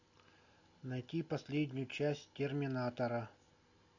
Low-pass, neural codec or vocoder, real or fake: 7.2 kHz; none; real